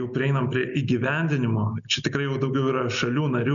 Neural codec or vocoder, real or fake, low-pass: none; real; 7.2 kHz